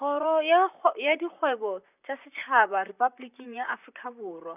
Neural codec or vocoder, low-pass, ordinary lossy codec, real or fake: vocoder, 44.1 kHz, 128 mel bands, Pupu-Vocoder; 3.6 kHz; none; fake